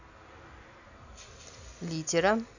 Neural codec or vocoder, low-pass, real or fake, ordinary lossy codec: none; 7.2 kHz; real; none